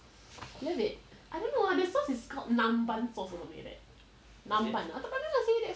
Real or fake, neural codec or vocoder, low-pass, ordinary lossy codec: real; none; none; none